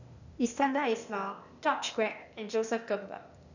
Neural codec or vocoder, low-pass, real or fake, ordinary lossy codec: codec, 16 kHz, 0.8 kbps, ZipCodec; 7.2 kHz; fake; none